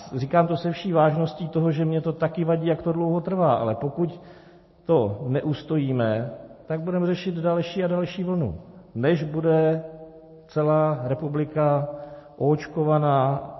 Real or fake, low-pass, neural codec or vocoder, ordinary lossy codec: real; 7.2 kHz; none; MP3, 24 kbps